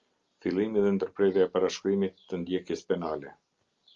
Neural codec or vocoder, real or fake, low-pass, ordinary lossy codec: none; real; 7.2 kHz; Opus, 32 kbps